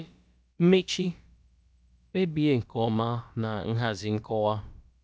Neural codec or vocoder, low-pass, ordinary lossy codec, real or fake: codec, 16 kHz, about 1 kbps, DyCAST, with the encoder's durations; none; none; fake